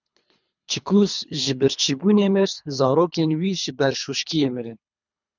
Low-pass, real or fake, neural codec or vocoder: 7.2 kHz; fake; codec, 24 kHz, 3 kbps, HILCodec